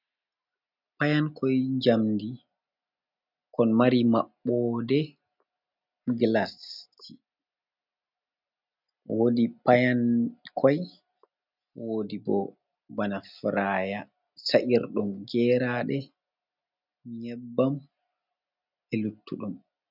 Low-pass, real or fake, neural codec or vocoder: 5.4 kHz; real; none